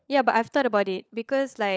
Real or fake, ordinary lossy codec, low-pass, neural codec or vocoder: fake; none; none; codec, 16 kHz, 16 kbps, FunCodec, trained on LibriTTS, 50 frames a second